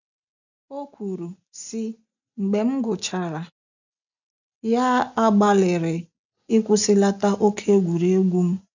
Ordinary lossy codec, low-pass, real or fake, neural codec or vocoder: none; 7.2 kHz; real; none